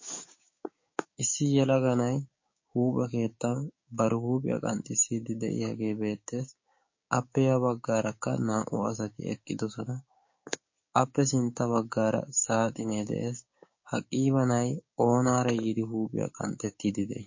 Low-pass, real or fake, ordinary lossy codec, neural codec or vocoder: 7.2 kHz; fake; MP3, 32 kbps; codec, 16 kHz, 6 kbps, DAC